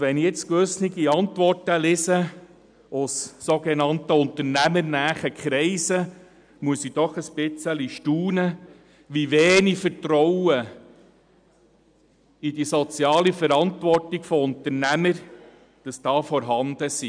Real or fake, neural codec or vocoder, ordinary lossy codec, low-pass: real; none; none; 9.9 kHz